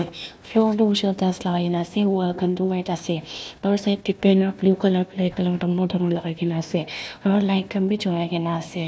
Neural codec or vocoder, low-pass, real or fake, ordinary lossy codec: codec, 16 kHz, 1 kbps, FunCodec, trained on Chinese and English, 50 frames a second; none; fake; none